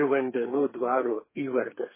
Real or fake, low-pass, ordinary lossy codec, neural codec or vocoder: fake; 3.6 kHz; MP3, 16 kbps; codec, 32 kHz, 1.9 kbps, SNAC